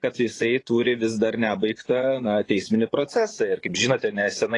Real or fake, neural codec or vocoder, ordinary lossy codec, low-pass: fake; vocoder, 24 kHz, 100 mel bands, Vocos; AAC, 32 kbps; 10.8 kHz